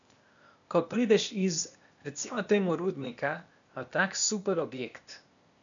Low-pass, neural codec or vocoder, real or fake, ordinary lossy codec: 7.2 kHz; codec, 16 kHz, 0.8 kbps, ZipCodec; fake; none